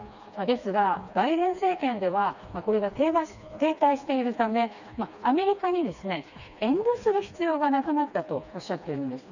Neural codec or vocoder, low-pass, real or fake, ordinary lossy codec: codec, 16 kHz, 2 kbps, FreqCodec, smaller model; 7.2 kHz; fake; none